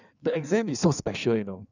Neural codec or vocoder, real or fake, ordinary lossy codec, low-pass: codec, 16 kHz in and 24 kHz out, 1.1 kbps, FireRedTTS-2 codec; fake; none; 7.2 kHz